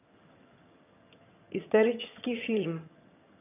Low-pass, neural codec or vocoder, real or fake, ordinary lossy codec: 3.6 kHz; vocoder, 22.05 kHz, 80 mel bands, HiFi-GAN; fake; none